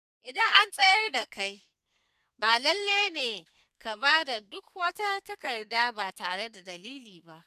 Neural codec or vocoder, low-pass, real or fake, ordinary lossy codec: codec, 32 kHz, 1.9 kbps, SNAC; 14.4 kHz; fake; none